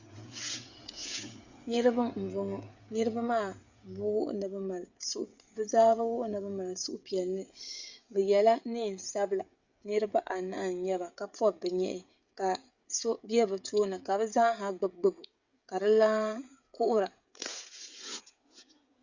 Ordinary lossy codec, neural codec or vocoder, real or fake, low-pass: Opus, 64 kbps; codec, 16 kHz, 16 kbps, FreqCodec, smaller model; fake; 7.2 kHz